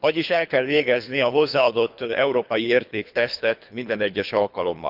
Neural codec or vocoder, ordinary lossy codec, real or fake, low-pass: codec, 24 kHz, 3 kbps, HILCodec; none; fake; 5.4 kHz